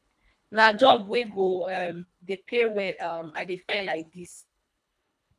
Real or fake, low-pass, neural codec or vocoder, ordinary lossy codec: fake; none; codec, 24 kHz, 1.5 kbps, HILCodec; none